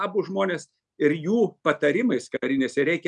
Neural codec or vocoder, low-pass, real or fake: none; 10.8 kHz; real